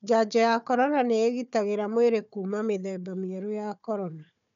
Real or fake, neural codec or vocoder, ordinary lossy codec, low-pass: fake; codec, 16 kHz, 4 kbps, FunCodec, trained on Chinese and English, 50 frames a second; none; 7.2 kHz